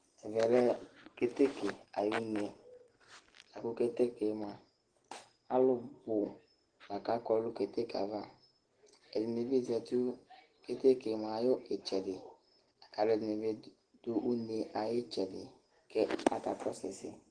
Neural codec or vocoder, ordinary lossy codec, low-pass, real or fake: none; Opus, 16 kbps; 9.9 kHz; real